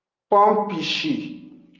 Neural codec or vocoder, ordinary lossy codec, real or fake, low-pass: none; Opus, 24 kbps; real; 7.2 kHz